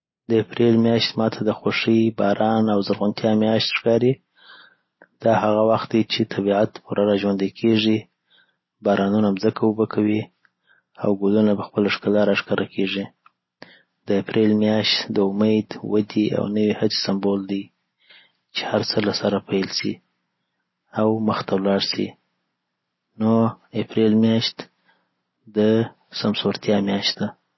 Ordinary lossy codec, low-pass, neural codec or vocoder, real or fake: MP3, 24 kbps; 7.2 kHz; none; real